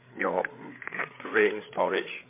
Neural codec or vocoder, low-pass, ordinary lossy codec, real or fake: codec, 16 kHz, 8 kbps, FreqCodec, larger model; 3.6 kHz; MP3, 24 kbps; fake